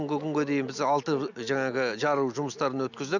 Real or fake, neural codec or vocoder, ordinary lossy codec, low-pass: real; none; none; 7.2 kHz